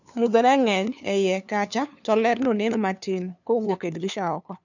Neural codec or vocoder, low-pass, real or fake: codec, 16 kHz, 2 kbps, FunCodec, trained on LibriTTS, 25 frames a second; 7.2 kHz; fake